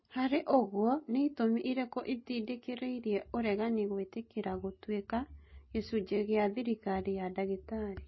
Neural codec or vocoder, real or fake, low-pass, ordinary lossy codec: none; real; 7.2 kHz; MP3, 24 kbps